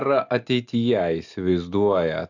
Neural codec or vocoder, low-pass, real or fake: none; 7.2 kHz; real